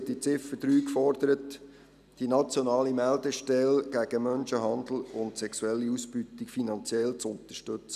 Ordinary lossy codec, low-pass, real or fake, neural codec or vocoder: none; 14.4 kHz; real; none